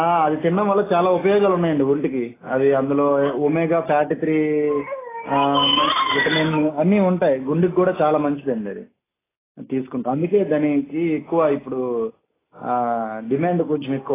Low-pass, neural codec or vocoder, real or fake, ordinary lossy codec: 3.6 kHz; none; real; AAC, 16 kbps